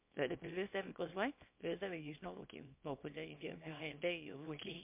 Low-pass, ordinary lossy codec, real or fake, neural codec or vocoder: 3.6 kHz; MP3, 32 kbps; fake; codec, 24 kHz, 0.9 kbps, WavTokenizer, small release